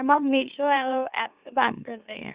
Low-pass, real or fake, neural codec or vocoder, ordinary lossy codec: 3.6 kHz; fake; autoencoder, 44.1 kHz, a latent of 192 numbers a frame, MeloTTS; Opus, 64 kbps